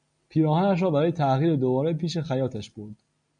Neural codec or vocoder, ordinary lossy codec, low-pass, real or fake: none; MP3, 96 kbps; 9.9 kHz; real